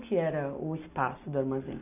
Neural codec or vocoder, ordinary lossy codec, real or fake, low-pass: none; none; real; 3.6 kHz